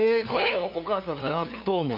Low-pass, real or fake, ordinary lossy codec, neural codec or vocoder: 5.4 kHz; fake; none; codec, 16 kHz, 2 kbps, FunCodec, trained on LibriTTS, 25 frames a second